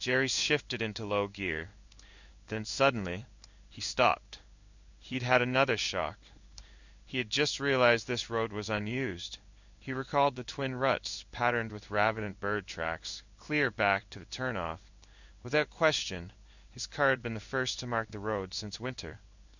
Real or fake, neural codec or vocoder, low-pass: fake; codec, 16 kHz in and 24 kHz out, 1 kbps, XY-Tokenizer; 7.2 kHz